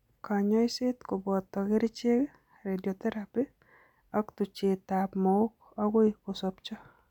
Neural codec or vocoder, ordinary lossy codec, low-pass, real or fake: none; none; 19.8 kHz; real